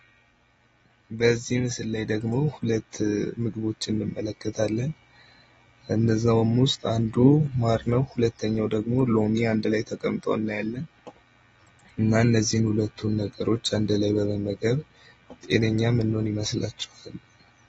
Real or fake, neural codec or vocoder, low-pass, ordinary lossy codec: real; none; 19.8 kHz; AAC, 24 kbps